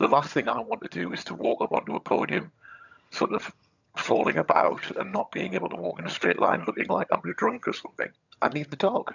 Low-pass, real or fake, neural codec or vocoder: 7.2 kHz; fake; vocoder, 22.05 kHz, 80 mel bands, HiFi-GAN